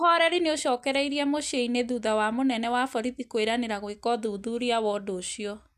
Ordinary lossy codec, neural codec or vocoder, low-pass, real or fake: none; none; 14.4 kHz; real